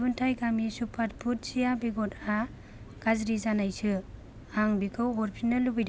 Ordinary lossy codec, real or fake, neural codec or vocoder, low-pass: none; real; none; none